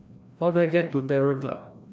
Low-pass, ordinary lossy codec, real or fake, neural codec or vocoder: none; none; fake; codec, 16 kHz, 1 kbps, FreqCodec, larger model